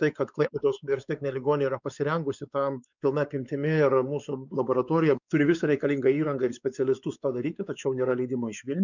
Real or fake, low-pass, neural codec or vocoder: fake; 7.2 kHz; codec, 16 kHz, 4 kbps, X-Codec, WavLM features, trained on Multilingual LibriSpeech